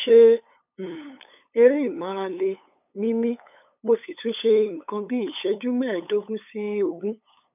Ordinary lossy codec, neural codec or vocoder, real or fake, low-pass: none; codec, 16 kHz, 8 kbps, FunCodec, trained on LibriTTS, 25 frames a second; fake; 3.6 kHz